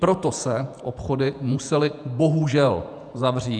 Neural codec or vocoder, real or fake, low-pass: vocoder, 48 kHz, 128 mel bands, Vocos; fake; 9.9 kHz